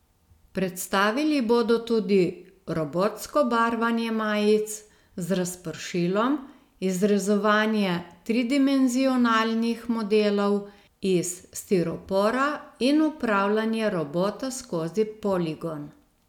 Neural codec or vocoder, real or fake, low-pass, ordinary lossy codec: none; real; 19.8 kHz; none